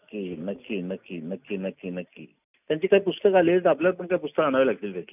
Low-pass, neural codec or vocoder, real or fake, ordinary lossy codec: 3.6 kHz; none; real; none